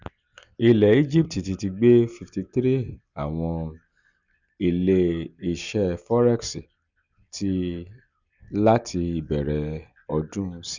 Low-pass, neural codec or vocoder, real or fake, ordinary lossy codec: 7.2 kHz; none; real; none